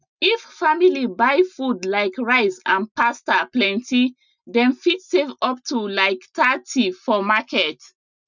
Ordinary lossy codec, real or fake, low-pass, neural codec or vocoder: none; real; 7.2 kHz; none